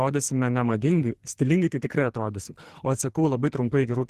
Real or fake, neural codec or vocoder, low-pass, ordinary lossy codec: fake; codec, 44.1 kHz, 2.6 kbps, SNAC; 14.4 kHz; Opus, 16 kbps